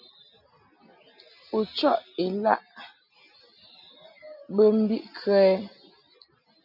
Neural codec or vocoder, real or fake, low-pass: none; real; 5.4 kHz